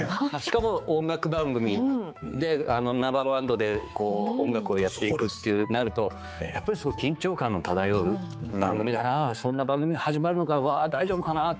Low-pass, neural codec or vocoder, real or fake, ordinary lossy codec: none; codec, 16 kHz, 4 kbps, X-Codec, HuBERT features, trained on balanced general audio; fake; none